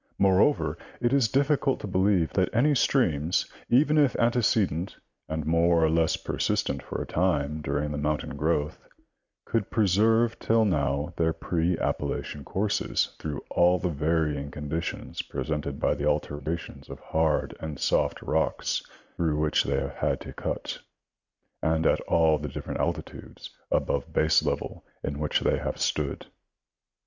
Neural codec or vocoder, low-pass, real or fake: none; 7.2 kHz; real